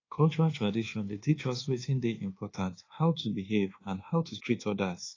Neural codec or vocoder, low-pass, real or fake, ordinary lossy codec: codec, 24 kHz, 1.2 kbps, DualCodec; 7.2 kHz; fake; AAC, 32 kbps